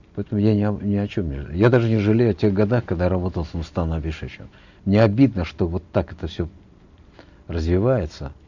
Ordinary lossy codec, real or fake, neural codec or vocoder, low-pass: none; real; none; 7.2 kHz